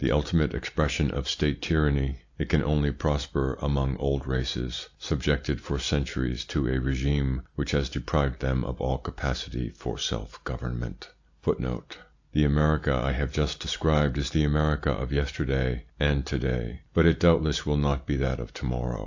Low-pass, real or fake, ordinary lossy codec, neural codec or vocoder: 7.2 kHz; real; AAC, 48 kbps; none